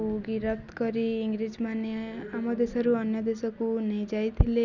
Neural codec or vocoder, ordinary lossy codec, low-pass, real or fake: none; none; 7.2 kHz; real